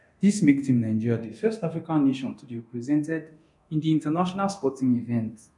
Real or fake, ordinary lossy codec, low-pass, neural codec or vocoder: fake; none; 10.8 kHz; codec, 24 kHz, 0.9 kbps, DualCodec